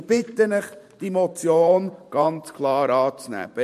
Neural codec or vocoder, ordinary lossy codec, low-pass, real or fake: vocoder, 44.1 kHz, 128 mel bands every 512 samples, BigVGAN v2; MP3, 64 kbps; 14.4 kHz; fake